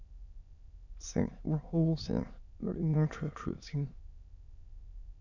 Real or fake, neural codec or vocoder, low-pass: fake; autoencoder, 22.05 kHz, a latent of 192 numbers a frame, VITS, trained on many speakers; 7.2 kHz